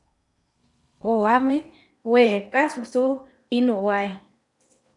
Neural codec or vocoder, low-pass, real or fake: codec, 16 kHz in and 24 kHz out, 0.8 kbps, FocalCodec, streaming, 65536 codes; 10.8 kHz; fake